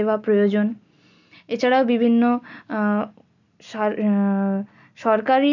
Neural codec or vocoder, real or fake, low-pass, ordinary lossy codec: none; real; 7.2 kHz; none